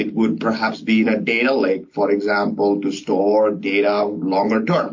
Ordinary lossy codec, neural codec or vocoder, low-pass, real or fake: MP3, 48 kbps; none; 7.2 kHz; real